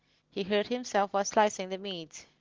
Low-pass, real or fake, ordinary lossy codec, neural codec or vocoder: 7.2 kHz; real; Opus, 16 kbps; none